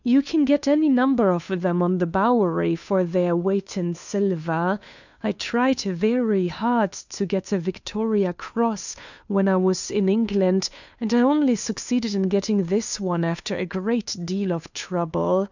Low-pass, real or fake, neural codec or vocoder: 7.2 kHz; fake; codec, 16 kHz, 2 kbps, FunCodec, trained on Chinese and English, 25 frames a second